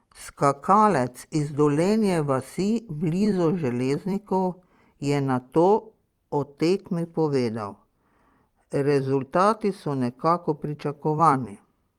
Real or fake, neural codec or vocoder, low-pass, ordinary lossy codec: fake; vocoder, 44.1 kHz, 128 mel bands every 256 samples, BigVGAN v2; 19.8 kHz; Opus, 32 kbps